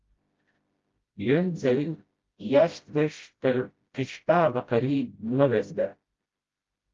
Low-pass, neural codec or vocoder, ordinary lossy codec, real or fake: 7.2 kHz; codec, 16 kHz, 0.5 kbps, FreqCodec, smaller model; Opus, 32 kbps; fake